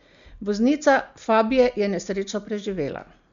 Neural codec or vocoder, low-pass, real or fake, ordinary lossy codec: none; 7.2 kHz; real; MP3, 64 kbps